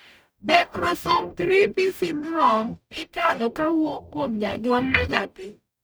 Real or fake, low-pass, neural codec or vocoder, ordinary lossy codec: fake; none; codec, 44.1 kHz, 0.9 kbps, DAC; none